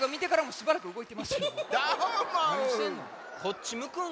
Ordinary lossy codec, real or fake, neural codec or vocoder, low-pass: none; real; none; none